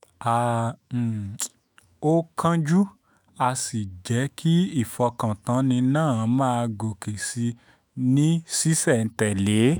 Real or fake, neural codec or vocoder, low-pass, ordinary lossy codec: fake; autoencoder, 48 kHz, 128 numbers a frame, DAC-VAE, trained on Japanese speech; none; none